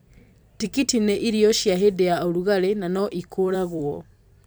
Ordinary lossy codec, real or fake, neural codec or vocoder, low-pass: none; fake; vocoder, 44.1 kHz, 128 mel bands every 256 samples, BigVGAN v2; none